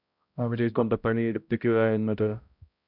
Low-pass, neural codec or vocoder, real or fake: 5.4 kHz; codec, 16 kHz, 0.5 kbps, X-Codec, HuBERT features, trained on balanced general audio; fake